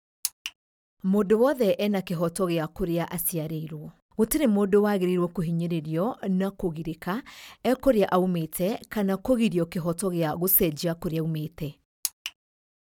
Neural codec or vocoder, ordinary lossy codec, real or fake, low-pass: none; none; real; 19.8 kHz